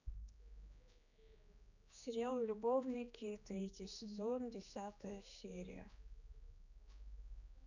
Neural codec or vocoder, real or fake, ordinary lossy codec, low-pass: codec, 16 kHz, 2 kbps, X-Codec, HuBERT features, trained on general audio; fake; none; 7.2 kHz